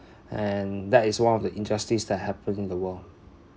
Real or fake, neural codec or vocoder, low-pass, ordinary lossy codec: real; none; none; none